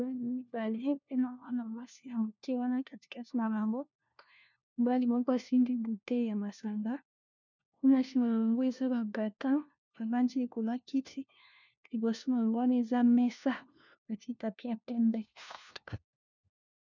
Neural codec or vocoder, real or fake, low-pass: codec, 16 kHz, 1 kbps, FunCodec, trained on LibriTTS, 50 frames a second; fake; 7.2 kHz